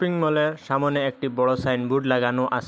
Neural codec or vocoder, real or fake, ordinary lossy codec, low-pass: none; real; none; none